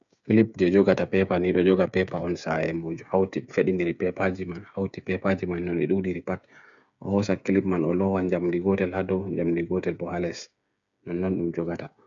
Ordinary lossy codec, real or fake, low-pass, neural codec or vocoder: none; fake; 7.2 kHz; codec, 16 kHz, 8 kbps, FreqCodec, smaller model